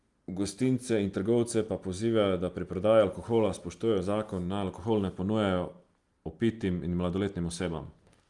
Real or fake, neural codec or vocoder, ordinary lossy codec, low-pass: real; none; Opus, 32 kbps; 10.8 kHz